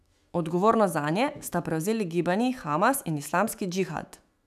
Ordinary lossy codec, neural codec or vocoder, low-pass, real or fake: none; autoencoder, 48 kHz, 128 numbers a frame, DAC-VAE, trained on Japanese speech; 14.4 kHz; fake